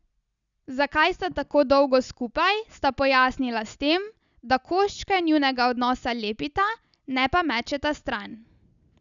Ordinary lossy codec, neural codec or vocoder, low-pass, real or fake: none; none; 7.2 kHz; real